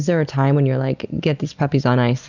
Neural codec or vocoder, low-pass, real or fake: none; 7.2 kHz; real